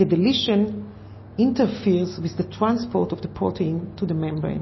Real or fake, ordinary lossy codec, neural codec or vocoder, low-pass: real; MP3, 24 kbps; none; 7.2 kHz